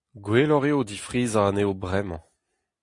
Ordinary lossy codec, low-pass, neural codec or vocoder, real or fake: AAC, 48 kbps; 10.8 kHz; none; real